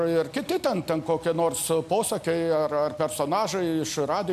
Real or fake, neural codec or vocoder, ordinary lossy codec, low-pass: real; none; MP3, 96 kbps; 14.4 kHz